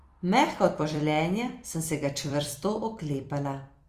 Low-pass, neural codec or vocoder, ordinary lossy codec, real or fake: 14.4 kHz; vocoder, 44.1 kHz, 128 mel bands every 256 samples, BigVGAN v2; Opus, 32 kbps; fake